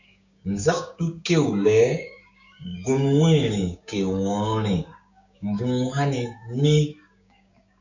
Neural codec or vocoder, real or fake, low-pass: codec, 16 kHz, 6 kbps, DAC; fake; 7.2 kHz